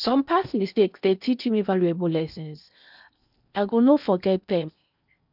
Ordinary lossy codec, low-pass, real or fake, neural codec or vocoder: none; 5.4 kHz; fake; codec, 16 kHz in and 24 kHz out, 0.8 kbps, FocalCodec, streaming, 65536 codes